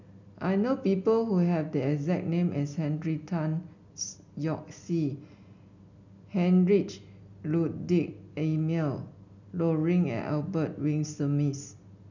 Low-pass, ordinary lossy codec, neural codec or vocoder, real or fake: 7.2 kHz; none; none; real